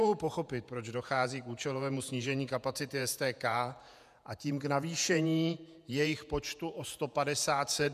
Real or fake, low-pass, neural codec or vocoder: fake; 14.4 kHz; vocoder, 48 kHz, 128 mel bands, Vocos